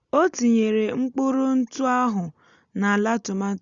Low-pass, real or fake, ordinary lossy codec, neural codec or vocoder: 7.2 kHz; real; Opus, 64 kbps; none